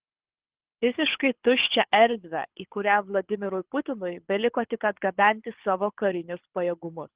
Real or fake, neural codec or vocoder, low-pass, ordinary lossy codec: fake; codec, 24 kHz, 6 kbps, HILCodec; 3.6 kHz; Opus, 16 kbps